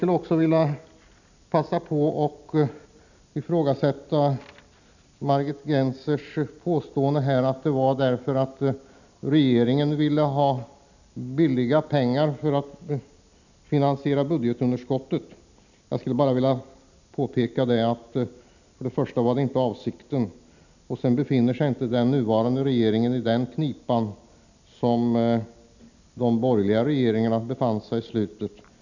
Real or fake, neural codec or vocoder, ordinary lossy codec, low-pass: real; none; none; 7.2 kHz